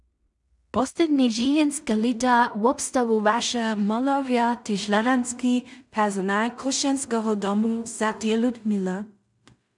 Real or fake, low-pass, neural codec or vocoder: fake; 10.8 kHz; codec, 16 kHz in and 24 kHz out, 0.4 kbps, LongCat-Audio-Codec, two codebook decoder